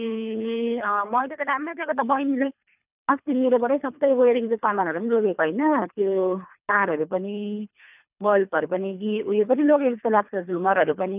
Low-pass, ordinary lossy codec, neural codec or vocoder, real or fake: 3.6 kHz; none; codec, 24 kHz, 3 kbps, HILCodec; fake